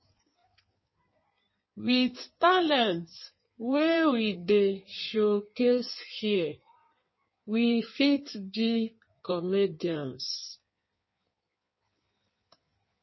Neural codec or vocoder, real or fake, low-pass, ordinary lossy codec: codec, 16 kHz in and 24 kHz out, 1.1 kbps, FireRedTTS-2 codec; fake; 7.2 kHz; MP3, 24 kbps